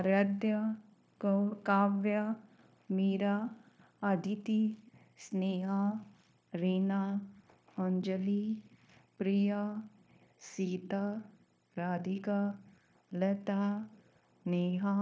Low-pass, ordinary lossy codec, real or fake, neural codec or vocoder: none; none; fake; codec, 16 kHz, 0.9 kbps, LongCat-Audio-Codec